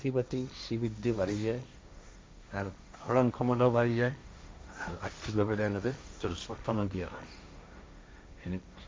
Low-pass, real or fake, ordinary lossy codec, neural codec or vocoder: none; fake; none; codec, 16 kHz, 1.1 kbps, Voila-Tokenizer